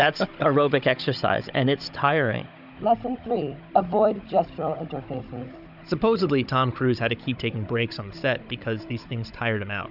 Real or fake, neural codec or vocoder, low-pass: fake; codec, 16 kHz, 16 kbps, FunCodec, trained on Chinese and English, 50 frames a second; 5.4 kHz